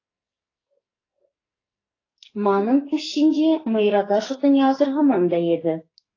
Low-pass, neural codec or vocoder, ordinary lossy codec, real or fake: 7.2 kHz; codec, 44.1 kHz, 2.6 kbps, SNAC; AAC, 32 kbps; fake